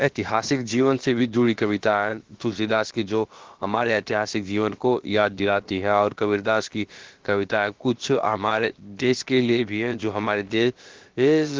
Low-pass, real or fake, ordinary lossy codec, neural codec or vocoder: 7.2 kHz; fake; Opus, 16 kbps; codec, 16 kHz, about 1 kbps, DyCAST, with the encoder's durations